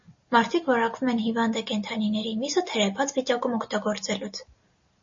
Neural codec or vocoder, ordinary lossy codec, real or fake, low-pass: none; MP3, 32 kbps; real; 7.2 kHz